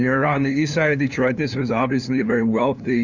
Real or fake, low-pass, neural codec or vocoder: fake; 7.2 kHz; codec, 16 kHz, 2 kbps, FunCodec, trained on LibriTTS, 25 frames a second